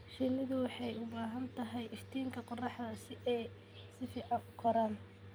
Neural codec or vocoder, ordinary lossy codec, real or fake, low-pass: vocoder, 44.1 kHz, 128 mel bands every 256 samples, BigVGAN v2; none; fake; none